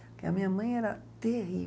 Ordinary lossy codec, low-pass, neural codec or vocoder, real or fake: none; none; none; real